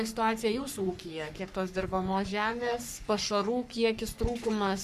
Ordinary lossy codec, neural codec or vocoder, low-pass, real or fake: Opus, 64 kbps; codec, 44.1 kHz, 3.4 kbps, Pupu-Codec; 14.4 kHz; fake